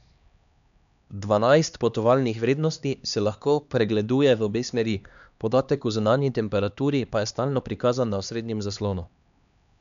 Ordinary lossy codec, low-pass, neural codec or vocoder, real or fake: none; 7.2 kHz; codec, 16 kHz, 2 kbps, X-Codec, HuBERT features, trained on LibriSpeech; fake